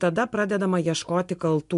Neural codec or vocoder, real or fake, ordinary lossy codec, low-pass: none; real; MP3, 64 kbps; 10.8 kHz